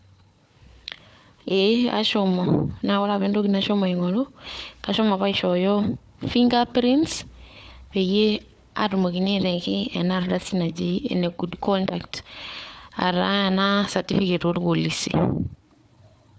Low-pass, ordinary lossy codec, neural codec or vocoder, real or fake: none; none; codec, 16 kHz, 4 kbps, FunCodec, trained on Chinese and English, 50 frames a second; fake